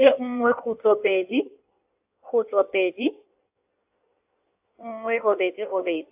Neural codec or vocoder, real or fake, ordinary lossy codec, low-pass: codec, 16 kHz in and 24 kHz out, 1.1 kbps, FireRedTTS-2 codec; fake; AAC, 32 kbps; 3.6 kHz